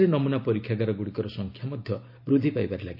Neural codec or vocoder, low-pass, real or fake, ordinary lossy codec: none; 5.4 kHz; real; none